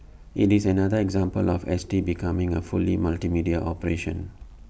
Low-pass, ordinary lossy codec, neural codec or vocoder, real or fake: none; none; none; real